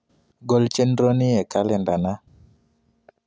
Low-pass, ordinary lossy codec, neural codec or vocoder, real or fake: none; none; none; real